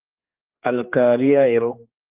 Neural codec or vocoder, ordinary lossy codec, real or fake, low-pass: codec, 16 kHz, 2 kbps, X-Codec, HuBERT features, trained on general audio; Opus, 24 kbps; fake; 3.6 kHz